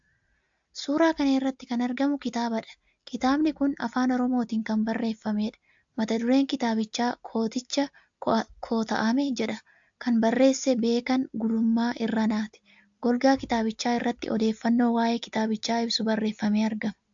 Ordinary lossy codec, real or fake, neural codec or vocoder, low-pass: AAC, 64 kbps; real; none; 7.2 kHz